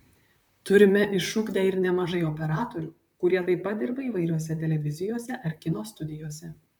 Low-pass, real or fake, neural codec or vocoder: 19.8 kHz; fake; vocoder, 44.1 kHz, 128 mel bands, Pupu-Vocoder